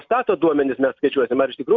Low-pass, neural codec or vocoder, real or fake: 7.2 kHz; none; real